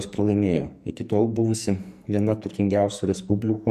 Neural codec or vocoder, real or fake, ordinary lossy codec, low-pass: codec, 44.1 kHz, 2.6 kbps, SNAC; fake; AAC, 96 kbps; 14.4 kHz